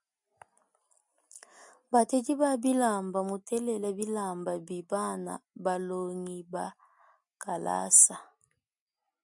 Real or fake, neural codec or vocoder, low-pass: real; none; 10.8 kHz